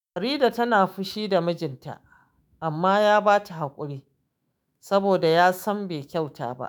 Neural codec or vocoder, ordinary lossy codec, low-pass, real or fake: autoencoder, 48 kHz, 128 numbers a frame, DAC-VAE, trained on Japanese speech; none; none; fake